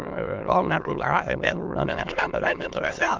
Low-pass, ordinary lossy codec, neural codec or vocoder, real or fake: 7.2 kHz; Opus, 32 kbps; autoencoder, 22.05 kHz, a latent of 192 numbers a frame, VITS, trained on many speakers; fake